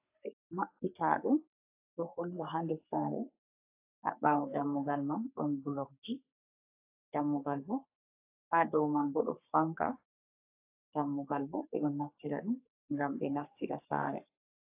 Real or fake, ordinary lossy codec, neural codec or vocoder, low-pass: fake; AAC, 32 kbps; codec, 44.1 kHz, 3.4 kbps, Pupu-Codec; 3.6 kHz